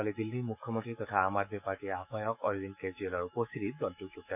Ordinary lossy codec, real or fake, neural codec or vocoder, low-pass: none; fake; autoencoder, 48 kHz, 128 numbers a frame, DAC-VAE, trained on Japanese speech; 3.6 kHz